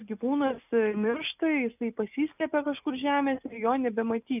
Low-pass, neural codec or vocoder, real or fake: 3.6 kHz; none; real